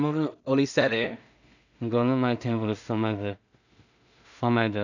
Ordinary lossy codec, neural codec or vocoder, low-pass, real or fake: none; codec, 16 kHz in and 24 kHz out, 0.4 kbps, LongCat-Audio-Codec, two codebook decoder; 7.2 kHz; fake